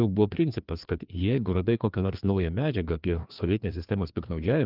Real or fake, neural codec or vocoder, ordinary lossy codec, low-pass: fake; codec, 16 kHz, 2 kbps, FreqCodec, larger model; Opus, 24 kbps; 5.4 kHz